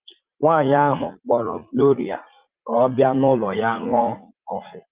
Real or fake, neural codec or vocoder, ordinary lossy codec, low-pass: fake; vocoder, 44.1 kHz, 80 mel bands, Vocos; Opus, 32 kbps; 3.6 kHz